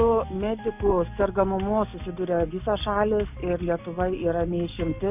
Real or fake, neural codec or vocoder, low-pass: real; none; 3.6 kHz